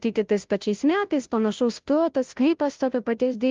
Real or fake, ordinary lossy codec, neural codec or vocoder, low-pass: fake; Opus, 32 kbps; codec, 16 kHz, 0.5 kbps, FunCodec, trained on Chinese and English, 25 frames a second; 7.2 kHz